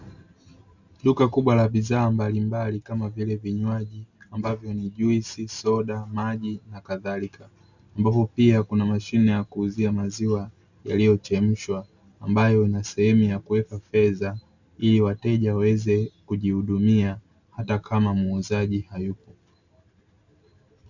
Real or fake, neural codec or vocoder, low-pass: real; none; 7.2 kHz